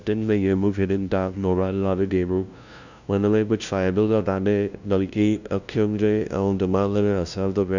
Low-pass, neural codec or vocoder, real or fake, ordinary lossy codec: 7.2 kHz; codec, 16 kHz, 0.5 kbps, FunCodec, trained on LibriTTS, 25 frames a second; fake; none